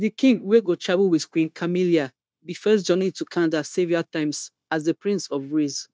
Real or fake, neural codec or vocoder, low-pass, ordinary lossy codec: fake; codec, 16 kHz, 0.9 kbps, LongCat-Audio-Codec; none; none